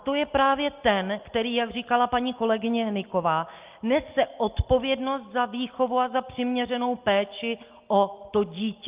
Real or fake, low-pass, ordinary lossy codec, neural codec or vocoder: real; 3.6 kHz; Opus, 32 kbps; none